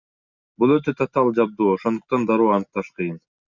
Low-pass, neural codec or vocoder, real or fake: 7.2 kHz; none; real